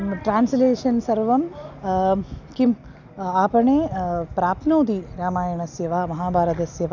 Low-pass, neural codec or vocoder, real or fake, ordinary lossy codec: 7.2 kHz; none; real; none